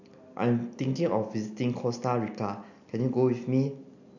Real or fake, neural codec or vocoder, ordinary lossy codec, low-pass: real; none; none; 7.2 kHz